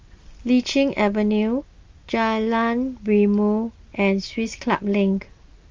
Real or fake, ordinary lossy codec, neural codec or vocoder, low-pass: real; Opus, 32 kbps; none; 7.2 kHz